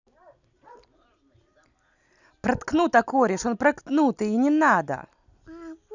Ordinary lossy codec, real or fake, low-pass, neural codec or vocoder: none; real; 7.2 kHz; none